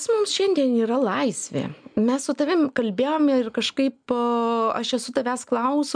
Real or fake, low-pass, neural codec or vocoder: real; 9.9 kHz; none